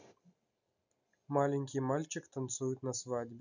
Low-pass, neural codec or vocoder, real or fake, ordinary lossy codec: 7.2 kHz; none; real; none